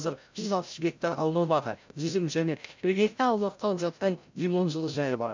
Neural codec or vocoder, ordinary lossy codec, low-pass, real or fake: codec, 16 kHz, 0.5 kbps, FreqCodec, larger model; MP3, 64 kbps; 7.2 kHz; fake